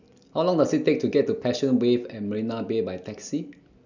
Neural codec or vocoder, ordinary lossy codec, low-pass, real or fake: none; none; 7.2 kHz; real